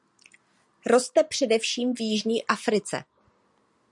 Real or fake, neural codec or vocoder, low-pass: real; none; 10.8 kHz